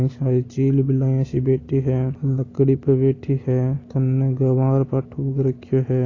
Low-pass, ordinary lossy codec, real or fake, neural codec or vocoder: 7.2 kHz; AAC, 32 kbps; real; none